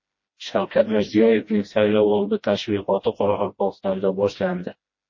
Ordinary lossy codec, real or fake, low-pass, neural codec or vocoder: MP3, 32 kbps; fake; 7.2 kHz; codec, 16 kHz, 1 kbps, FreqCodec, smaller model